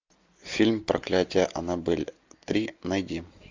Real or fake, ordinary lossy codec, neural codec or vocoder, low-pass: real; MP3, 64 kbps; none; 7.2 kHz